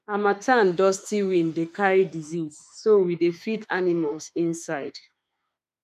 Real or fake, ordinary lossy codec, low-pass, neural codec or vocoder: fake; none; 14.4 kHz; autoencoder, 48 kHz, 32 numbers a frame, DAC-VAE, trained on Japanese speech